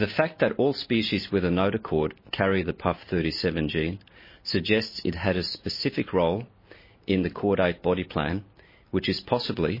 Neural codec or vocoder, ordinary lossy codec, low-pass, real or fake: none; MP3, 24 kbps; 5.4 kHz; real